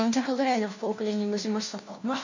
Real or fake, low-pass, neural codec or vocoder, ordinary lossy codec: fake; 7.2 kHz; codec, 16 kHz, 1 kbps, FunCodec, trained on LibriTTS, 50 frames a second; none